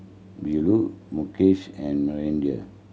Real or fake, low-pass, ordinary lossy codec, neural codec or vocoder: real; none; none; none